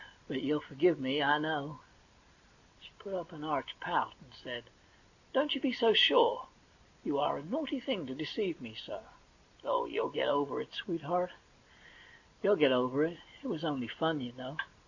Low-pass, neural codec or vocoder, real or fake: 7.2 kHz; none; real